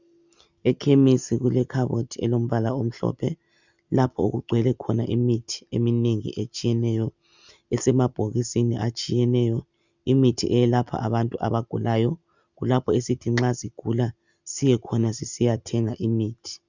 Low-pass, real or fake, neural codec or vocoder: 7.2 kHz; real; none